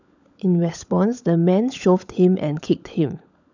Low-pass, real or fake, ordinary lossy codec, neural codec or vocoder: 7.2 kHz; fake; none; codec, 16 kHz, 16 kbps, FunCodec, trained on LibriTTS, 50 frames a second